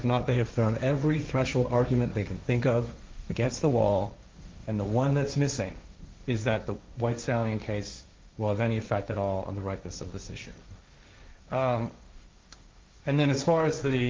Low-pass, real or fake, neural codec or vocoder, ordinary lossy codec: 7.2 kHz; fake; codec, 16 kHz, 1.1 kbps, Voila-Tokenizer; Opus, 32 kbps